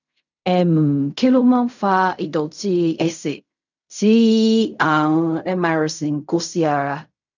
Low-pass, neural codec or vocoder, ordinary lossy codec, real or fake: 7.2 kHz; codec, 16 kHz in and 24 kHz out, 0.4 kbps, LongCat-Audio-Codec, fine tuned four codebook decoder; none; fake